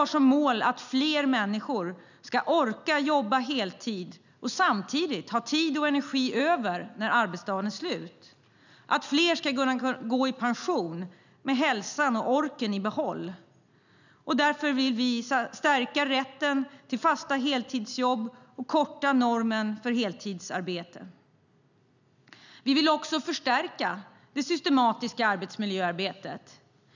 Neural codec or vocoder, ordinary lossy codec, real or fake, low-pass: none; none; real; 7.2 kHz